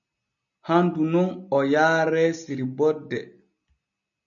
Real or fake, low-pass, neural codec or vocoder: real; 7.2 kHz; none